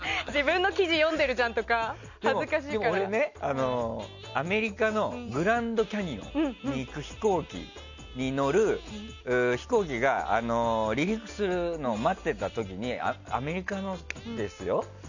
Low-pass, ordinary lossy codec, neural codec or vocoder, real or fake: 7.2 kHz; none; none; real